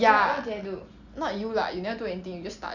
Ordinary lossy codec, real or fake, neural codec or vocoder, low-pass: none; real; none; 7.2 kHz